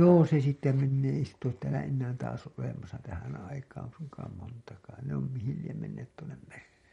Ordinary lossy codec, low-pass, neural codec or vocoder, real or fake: MP3, 48 kbps; 19.8 kHz; none; real